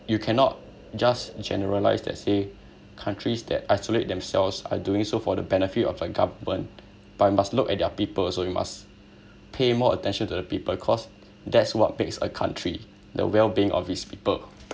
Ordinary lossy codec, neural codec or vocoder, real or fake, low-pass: none; none; real; none